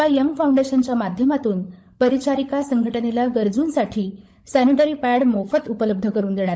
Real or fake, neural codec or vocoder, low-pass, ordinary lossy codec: fake; codec, 16 kHz, 16 kbps, FunCodec, trained on LibriTTS, 50 frames a second; none; none